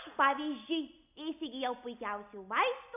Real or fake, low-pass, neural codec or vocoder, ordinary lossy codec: real; 3.6 kHz; none; AAC, 32 kbps